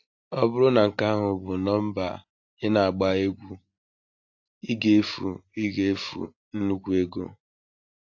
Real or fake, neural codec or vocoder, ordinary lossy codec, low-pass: real; none; AAC, 48 kbps; 7.2 kHz